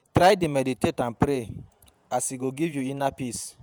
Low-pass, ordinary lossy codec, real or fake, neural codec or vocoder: none; none; fake; vocoder, 48 kHz, 128 mel bands, Vocos